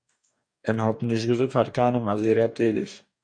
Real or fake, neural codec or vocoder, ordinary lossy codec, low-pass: fake; codec, 44.1 kHz, 2.6 kbps, DAC; MP3, 96 kbps; 9.9 kHz